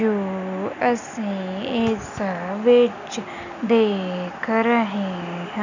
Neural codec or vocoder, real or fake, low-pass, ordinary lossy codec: none; real; 7.2 kHz; none